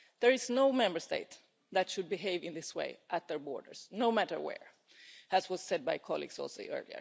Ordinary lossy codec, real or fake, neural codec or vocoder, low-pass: none; real; none; none